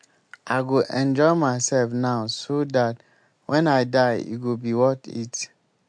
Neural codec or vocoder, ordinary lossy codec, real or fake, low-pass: none; MP3, 64 kbps; real; 9.9 kHz